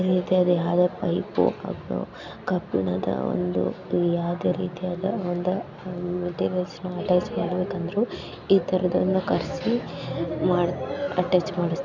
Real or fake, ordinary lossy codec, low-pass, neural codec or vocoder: real; none; 7.2 kHz; none